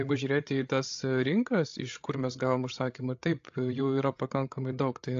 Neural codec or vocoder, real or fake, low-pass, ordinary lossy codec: codec, 16 kHz, 16 kbps, FreqCodec, larger model; fake; 7.2 kHz; AAC, 48 kbps